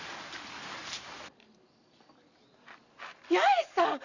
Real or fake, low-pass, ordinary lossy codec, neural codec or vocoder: real; 7.2 kHz; none; none